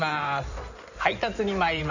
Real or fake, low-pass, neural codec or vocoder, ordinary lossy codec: fake; 7.2 kHz; codec, 16 kHz in and 24 kHz out, 2.2 kbps, FireRedTTS-2 codec; none